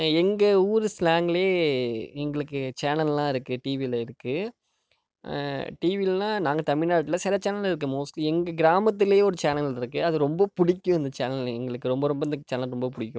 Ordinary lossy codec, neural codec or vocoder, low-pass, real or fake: none; none; none; real